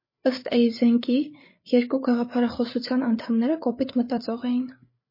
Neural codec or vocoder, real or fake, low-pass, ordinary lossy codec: codec, 16 kHz, 8 kbps, FreqCodec, larger model; fake; 5.4 kHz; MP3, 24 kbps